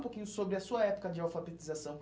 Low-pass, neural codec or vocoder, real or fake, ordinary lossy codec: none; none; real; none